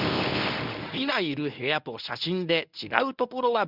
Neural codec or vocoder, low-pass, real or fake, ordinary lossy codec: codec, 24 kHz, 0.9 kbps, WavTokenizer, medium speech release version 1; 5.4 kHz; fake; none